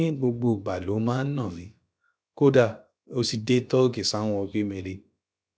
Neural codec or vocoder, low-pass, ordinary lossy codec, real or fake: codec, 16 kHz, about 1 kbps, DyCAST, with the encoder's durations; none; none; fake